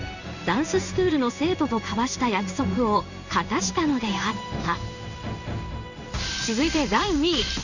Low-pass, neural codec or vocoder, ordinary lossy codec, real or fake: 7.2 kHz; codec, 16 kHz in and 24 kHz out, 1 kbps, XY-Tokenizer; none; fake